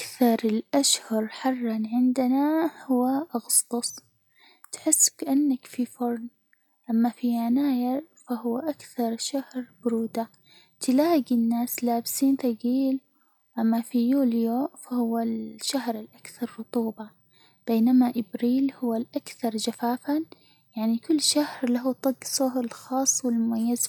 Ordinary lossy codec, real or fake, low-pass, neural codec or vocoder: none; real; 19.8 kHz; none